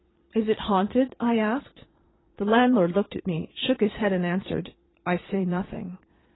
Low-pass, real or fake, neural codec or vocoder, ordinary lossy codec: 7.2 kHz; fake; vocoder, 22.05 kHz, 80 mel bands, Vocos; AAC, 16 kbps